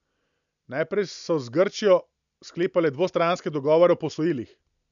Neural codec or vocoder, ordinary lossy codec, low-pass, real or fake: none; none; 7.2 kHz; real